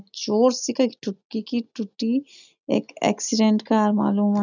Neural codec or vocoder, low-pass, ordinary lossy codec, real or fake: none; 7.2 kHz; none; real